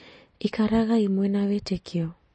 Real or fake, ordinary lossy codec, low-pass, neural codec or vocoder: real; MP3, 32 kbps; 10.8 kHz; none